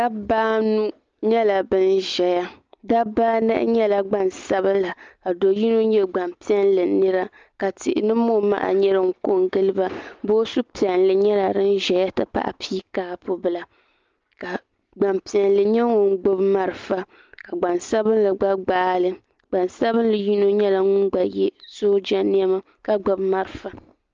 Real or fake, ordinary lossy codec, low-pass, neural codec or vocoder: real; Opus, 24 kbps; 7.2 kHz; none